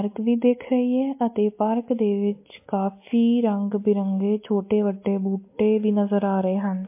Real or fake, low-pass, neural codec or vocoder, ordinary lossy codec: real; 3.6 kHz; none; MP3, 24 kbps